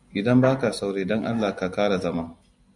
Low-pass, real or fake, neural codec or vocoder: 10.8 kHz; real; none